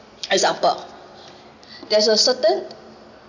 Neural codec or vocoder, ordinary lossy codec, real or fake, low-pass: none; none; real; 7.2 kHz